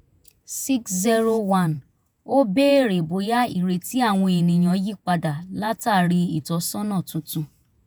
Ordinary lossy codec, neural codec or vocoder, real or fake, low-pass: none; vocoder, 48 kHz, 128 mel bands, Vocos; fake; none